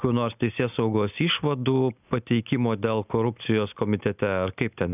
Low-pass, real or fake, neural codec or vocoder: 3.6 kHz; real; none